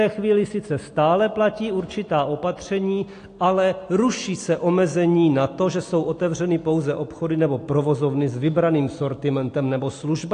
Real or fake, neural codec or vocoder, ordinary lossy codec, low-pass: real; none; AAC, 48 kbps; 9.9 kHz